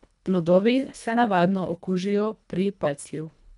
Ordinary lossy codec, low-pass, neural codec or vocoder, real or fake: none; 10.8 kHz; codec, 24 kHz, 1.5 kbps, HILCodec; fake